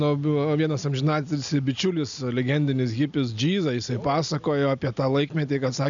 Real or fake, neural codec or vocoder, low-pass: real; none; 7.2 kHz